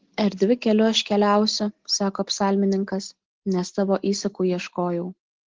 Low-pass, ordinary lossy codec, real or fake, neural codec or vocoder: 7.2 kHz; Opus, 16 kbps; real; none